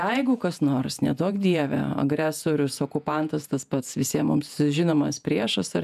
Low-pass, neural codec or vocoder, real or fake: 14.4 kHz; vocoder, 48 kHz, 128 mel bands, Vocos; fake